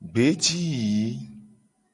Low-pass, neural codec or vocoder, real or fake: 10.8 kHz; none; real